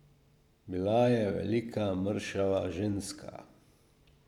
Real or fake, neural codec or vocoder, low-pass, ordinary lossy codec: fake; vocoder, 44.1 kHz, 128 mel bands every 512 samples, BigVGAN v2; 19.8 kHz; none